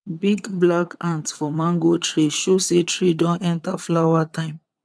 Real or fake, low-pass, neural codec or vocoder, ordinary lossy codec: fake; none; vocoder, 22.05 kHz, 80 mel bands, WaveNeXt; none